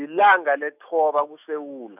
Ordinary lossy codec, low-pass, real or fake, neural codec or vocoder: none; 3.6 kHz; fake; autoencoder, 48 kHz, 128 numbers a frame, DAC-VAE, trained on Japanese speech